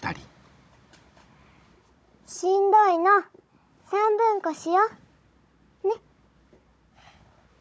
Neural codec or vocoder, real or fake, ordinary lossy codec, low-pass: codec, 16 kHz, 16 kbps, FunCodec, trained on Chinese and English, 50 frames a second; fake; none; none